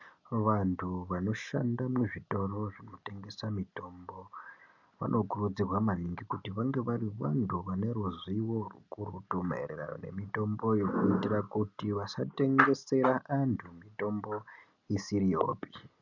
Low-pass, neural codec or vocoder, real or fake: 7.2 kHz; none; real